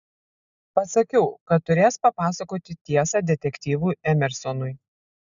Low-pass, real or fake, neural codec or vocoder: 7.2 kHz; real; none